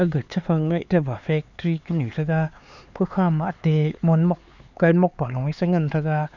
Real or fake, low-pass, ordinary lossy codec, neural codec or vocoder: fake; 7.2 kHz; none; codec, 16 kHz, 4 kbps, X-Codec, HuBERT features, trained on LibriSpeech